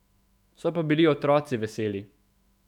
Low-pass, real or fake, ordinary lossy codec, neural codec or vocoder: 19.8 kHz; fake; none; autoencoder, 48 kHz, 128 numbers a frame, DAC-VAE, trained on Japanese speech